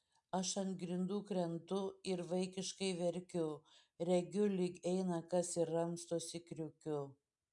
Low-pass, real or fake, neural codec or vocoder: 10.8 kHz; real; none